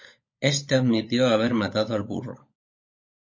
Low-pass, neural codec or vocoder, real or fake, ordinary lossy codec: 7.2 kHz; codec, 16 kHz, 16 kbps, FunCodec, trained on LibriTTS, 50 frames a second; fake; MP3, 32 kbps